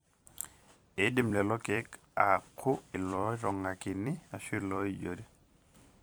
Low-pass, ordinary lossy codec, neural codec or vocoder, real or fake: none; none; vocoder, 44.1 kHz, 128 mel bands every 256 samples, BigVGAN v2; fake